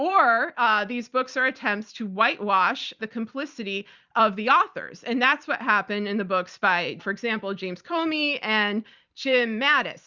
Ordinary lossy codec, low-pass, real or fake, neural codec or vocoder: Opus, 64 kbps; 7.2 kHz; fake; vocoder, 44.1 kHz, 128 mel bands every 256 samples, BigVGAN v2